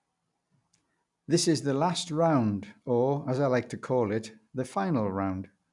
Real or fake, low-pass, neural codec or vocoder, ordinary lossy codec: real; 10.8 kHz; none; none